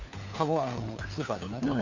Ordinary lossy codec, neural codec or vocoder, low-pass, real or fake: AAC, 48 kbps; codec, 16 kHz, 4 kbps, FunCodec, trained on LibriTTS, 50 frames a second; 7.2 kHz; fake